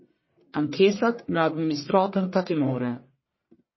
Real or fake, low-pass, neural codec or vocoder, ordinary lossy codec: fake; 7.2 kHz; codec, 44.1 kHz, 1.7 kbps, Pupu-Codec; MP3, 24 kbps